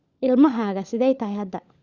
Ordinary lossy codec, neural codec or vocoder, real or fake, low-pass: Opus, 64 kbps; codec, 16 kHz, 16 kbps, FunCodec, trained on LibriTTS, 50 frames a second; fake; 7.2 kHz